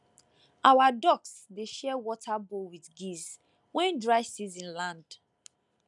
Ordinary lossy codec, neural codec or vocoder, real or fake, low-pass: none; none; real; 10.8 kHz